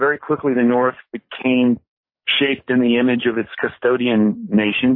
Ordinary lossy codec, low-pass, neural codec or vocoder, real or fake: MP3, 24 kbps; 5.4 kHz; codec, 44.1 kHz, 7.8 kbps, DAC; fake